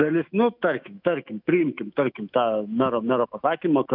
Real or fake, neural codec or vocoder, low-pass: fake; autoencoder, 48 kHz, 128 numbers a frame, DAC-VAE, trained on Japanese speech; 5.4 kHz